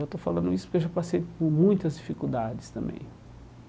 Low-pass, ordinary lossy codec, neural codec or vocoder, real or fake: none; none; none; real